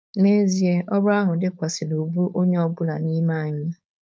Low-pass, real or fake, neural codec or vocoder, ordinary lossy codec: none; fake; codec, 16 kHz, 4.8 kbps, FACodec; none